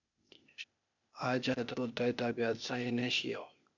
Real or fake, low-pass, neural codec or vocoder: fake; 7.2 kHz; codec, 16 kHz, 0.8 kbps, ZipCodec